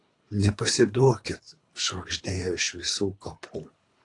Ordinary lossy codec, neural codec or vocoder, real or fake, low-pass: AAC, 48 kbps; codec, 24 kHz, 3 kbps, HILCodec; fake; 10.8 kHz